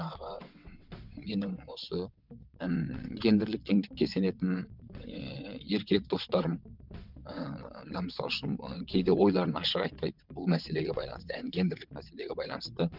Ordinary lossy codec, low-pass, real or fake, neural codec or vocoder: none; 5.4 kHz; fake; vocoder, 22.05 kHz, 80 mel bands, WaveNeXt